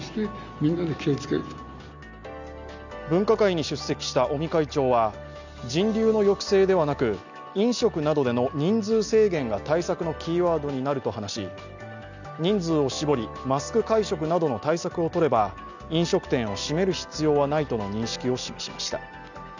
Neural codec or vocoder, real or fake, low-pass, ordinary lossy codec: none; real; 7.2 kHz; none